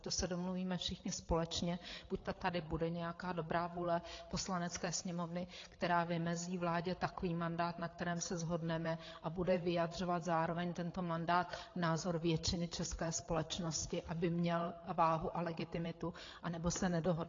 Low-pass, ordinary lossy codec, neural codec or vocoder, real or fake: 7.2 kHz; AAC, 32 kbps; codec, 16 kHz, 8 kbps, FreqCodec, larger model; fake